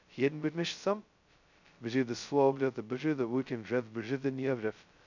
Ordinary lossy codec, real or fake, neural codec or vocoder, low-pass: none; fake; codec, 16 kHz, 0.2 kbps, FocalCodec; 7.2 kHz